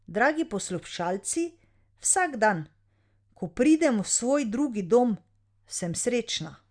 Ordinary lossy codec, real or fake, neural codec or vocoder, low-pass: Opus, 64 kbps; real; none; 9.9 kHz